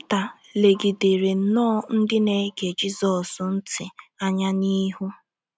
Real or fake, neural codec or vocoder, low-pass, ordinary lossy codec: real; none; none; none